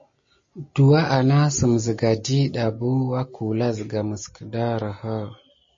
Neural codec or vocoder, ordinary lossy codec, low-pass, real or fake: none; MP3, 32 kbps; 7.2 kHz; real